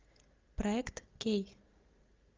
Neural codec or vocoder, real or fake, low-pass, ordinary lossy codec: none; real; 7.2 kHz; Opus, 24 kbps